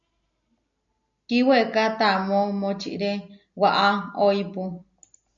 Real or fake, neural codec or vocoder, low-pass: real; none; 7.2 kHz